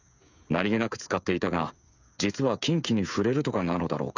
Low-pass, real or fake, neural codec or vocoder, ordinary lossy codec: 7.2 kHz; fake; codec, 16 kHz, 8 kbps, FreqCodec, smaller model; none